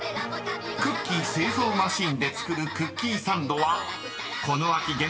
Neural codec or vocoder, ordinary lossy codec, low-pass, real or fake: none; none; none; real